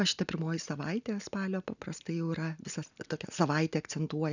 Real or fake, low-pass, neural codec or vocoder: real; 7.2 kHz; none